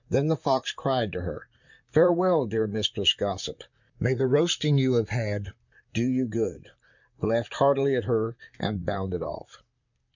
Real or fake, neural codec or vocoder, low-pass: fake; vocoder, 44.1 kHz, 128 mel bands, Pupu-Vocoder; 7.2 kHz